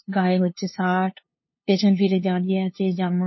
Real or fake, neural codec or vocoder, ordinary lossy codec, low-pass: fake; codec, 16 kHz, 2 kbps, FunCodec, trained on LibriTTS, 25 frames a second; MP3, 24 kbps; 7.2 kHz